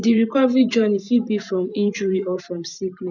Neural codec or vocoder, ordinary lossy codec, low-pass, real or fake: vocoder, 44.1 kHz, 128 mel bands every 512 samples, BigVGAN v2; none; 7.2 kHz; fake